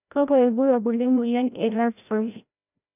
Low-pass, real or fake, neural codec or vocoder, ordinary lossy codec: 3.6 kHz; fake; codec, 16 kHz, 0.5 kbps, FreqCodec, larger model; none